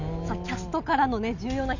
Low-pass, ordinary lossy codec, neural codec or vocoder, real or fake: 7.2 kHz; none; none; real